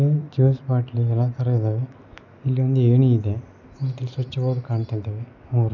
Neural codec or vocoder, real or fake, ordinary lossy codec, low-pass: none; real; none; 7.2 kHz